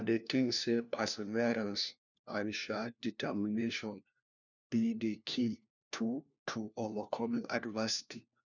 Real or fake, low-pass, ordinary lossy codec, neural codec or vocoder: fake; 7.2 kHz; none; codec, 16 kHz, 1 kbps, FunCodec, trained on LibriTTS, 50 frames a second